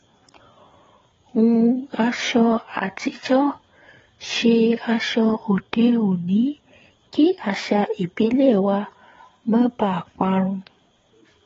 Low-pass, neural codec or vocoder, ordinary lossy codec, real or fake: 7.2 kHz; codec, 16 kHz, 4 kbps, FreqCodec, larger model; AAC, 24 kbps; fake